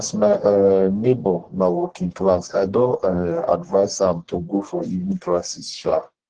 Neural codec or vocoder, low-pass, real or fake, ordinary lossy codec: codec, 44.1 kHz, 1.7 kbps, Pupu-Codec; 9.9 kHz; fake; Opus, 16 kbps